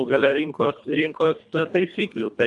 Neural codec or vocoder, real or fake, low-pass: codec, 24 kHz, 1.5 kbps, HILCodec; fake; 10.8 kHz